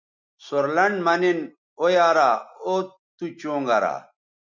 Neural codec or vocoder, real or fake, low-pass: none; real; 7.2 kHz